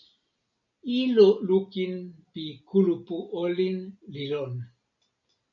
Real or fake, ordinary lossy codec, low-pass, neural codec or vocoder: real; MP3, 96 kbps; 7.2 kHz; none